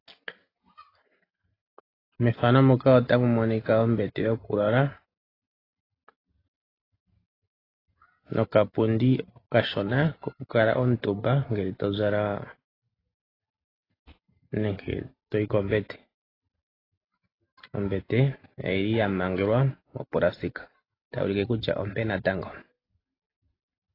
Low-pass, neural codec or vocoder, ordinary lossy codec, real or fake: 5.4 kHz; none; AAC, 24 kbps; real